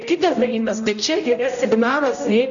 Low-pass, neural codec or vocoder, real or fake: 7.2 kHz; codec, 16 kHz, 0.5 kbps, X-Codec, HuBERT features, trained on general audio; fake